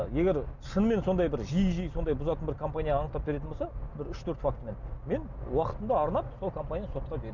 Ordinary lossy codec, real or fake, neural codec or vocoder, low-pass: none; real; none; 7.2 kHz